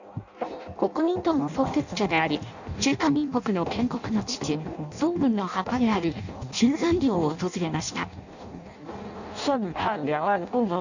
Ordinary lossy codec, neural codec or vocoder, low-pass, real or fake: none; codec, 16 kHz in and 24 kHz out, 0.6 kbps, FireRedTTS-2 codec; 7.2 kHz; fake